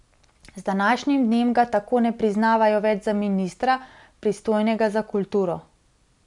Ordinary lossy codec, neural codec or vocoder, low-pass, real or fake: none; none; 10.8 kHz; real